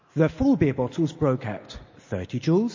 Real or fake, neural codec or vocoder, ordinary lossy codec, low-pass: real; none; MP3, 32 kbps; 7.2 kHz